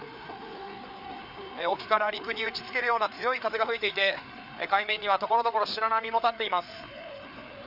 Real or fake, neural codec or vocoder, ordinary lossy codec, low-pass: fake; codec, 16 kHz, 4 kbps, FreqCodec, larger model; none; 5.4 kHz